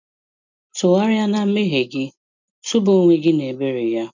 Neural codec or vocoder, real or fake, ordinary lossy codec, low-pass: none; real; none; 7.2 kHz